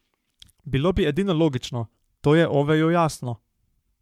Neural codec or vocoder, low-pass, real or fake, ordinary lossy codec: codec, 44.1 kHz, 7.8 kbps, Pupu-Codec; 19.8 kHz; fake; MP3, 96 kbps